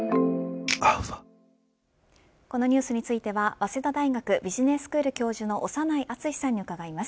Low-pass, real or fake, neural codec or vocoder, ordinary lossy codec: none; real; none; none